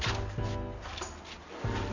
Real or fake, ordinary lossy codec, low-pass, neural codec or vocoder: real; none; 7.2 kHz; none